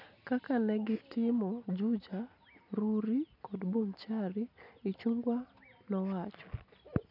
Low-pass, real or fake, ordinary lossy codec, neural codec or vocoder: 5.4 kHz; real; none; none